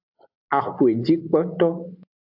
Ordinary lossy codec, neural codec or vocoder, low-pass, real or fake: AAC, 48 kbps; none; 5.4 kHz; real